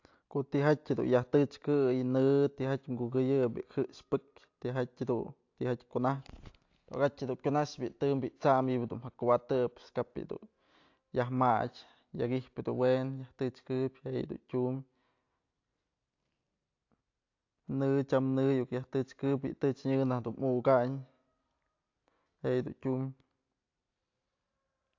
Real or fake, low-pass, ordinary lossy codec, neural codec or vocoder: real; 7.2 kHz; AAC, 48 kbps; none